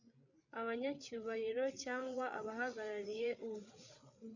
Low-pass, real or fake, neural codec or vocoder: 7.2 kHz; fake; codec, 16 kHz, 8 kbps, FreqCodec, larger model